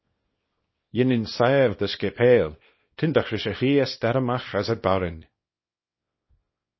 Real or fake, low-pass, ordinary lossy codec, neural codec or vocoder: fake; 7.2 kHz; MP3, 24 kbps; codec, 24 kHz, 0.9 kbps, WavTokenizer, small release